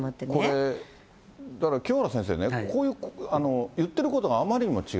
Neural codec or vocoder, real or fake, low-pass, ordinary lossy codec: none; real; none; none